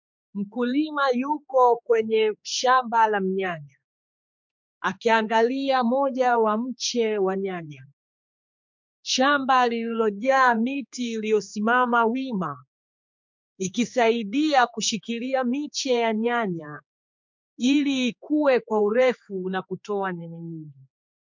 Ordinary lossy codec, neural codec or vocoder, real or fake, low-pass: MP3, 64 kbps; codec, 16 kHz, 4 kbps, X-Codec, HuBERT features, trained on general audio; fake; 7.2 kHz